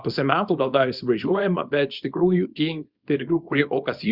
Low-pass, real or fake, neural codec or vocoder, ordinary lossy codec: 5.4 kHz; fake; codec, 24 kHz, 0.9 kbps, WavTokenizer, small release; Opus, 64 kbps